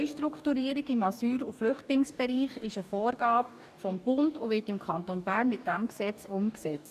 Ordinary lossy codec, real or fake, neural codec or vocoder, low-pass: none; fake; codec, 44.1 kHz, 2.6 kbps, DAC; 14.4 kHz